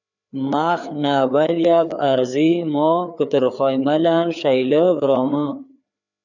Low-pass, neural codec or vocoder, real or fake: 7.2 kHz; codec, 16 kHz, 4 kbps, FreqCodec, larger model; fake